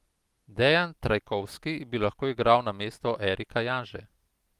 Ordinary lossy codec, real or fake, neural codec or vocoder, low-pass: Opus, 24 kbps; real; none; 14.4 kHz